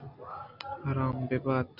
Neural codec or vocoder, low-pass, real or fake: none; 5.4 kHz; real